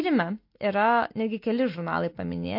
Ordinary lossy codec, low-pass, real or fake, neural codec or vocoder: MP3, 32 kbps; 5.4 kHz; real; none